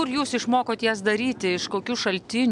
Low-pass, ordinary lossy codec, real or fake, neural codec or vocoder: 10.8 kHz; MP3, 96 kbps; real; none